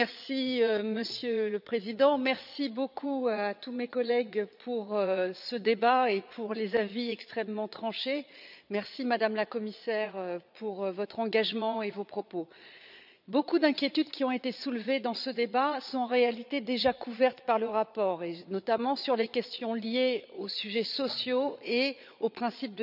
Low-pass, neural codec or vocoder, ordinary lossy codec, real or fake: 5.4 kHz; vocoder, 22.05 kHz, 80 mel bands, Vocos; none; fake